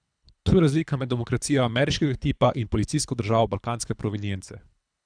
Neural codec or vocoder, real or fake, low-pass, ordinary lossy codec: codec, 24 kHz, 3 kbps, HILCodec; fake; 9.9 kHz; none